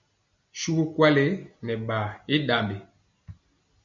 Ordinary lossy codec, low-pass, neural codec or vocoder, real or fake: MP3, 64 kbps; 7.2 kHz; none; real